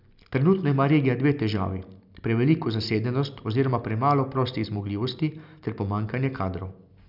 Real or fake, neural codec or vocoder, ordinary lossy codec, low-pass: real; none; none; 5.4 kHz